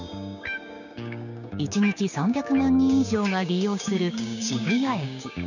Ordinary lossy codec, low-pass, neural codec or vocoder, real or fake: none; 7.2 kHz; codec, 16 kHz, 6 kbps, DAC; fake